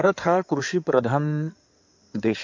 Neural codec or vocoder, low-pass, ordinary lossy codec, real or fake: codec, 16 kHz in and 24 kHz out, 2.2 kbps, FireRedTTS-2 codec; 7.2 kHz; MP3, 48 kbps; fake